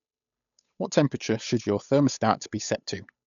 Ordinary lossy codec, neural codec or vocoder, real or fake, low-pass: none; codec, 16 kHz, 8 kbps, FunCodec, trained on Chinese and English, 25 frames a second; fake; 7.2 kHz